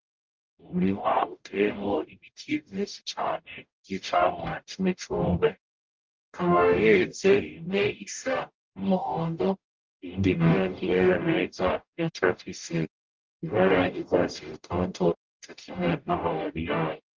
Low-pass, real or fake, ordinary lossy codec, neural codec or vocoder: 7.2 kHz; fake; Opus, 32 kbps; codec, 44.1 kHz, 0.9 kbps, DAC